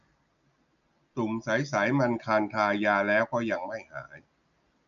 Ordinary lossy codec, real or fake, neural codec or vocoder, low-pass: MP3, 96 kbps; real; none; 7.2 kHz